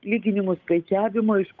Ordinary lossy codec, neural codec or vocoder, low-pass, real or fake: Opus, 24 kbps; codec, 16 kHz, 8 kbps, FunCodec, trained on Chinese and English, 25 frames a second; 7.2 kHz; fake